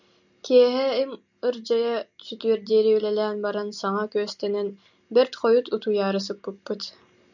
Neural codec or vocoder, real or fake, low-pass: none; real; 7.2 kHz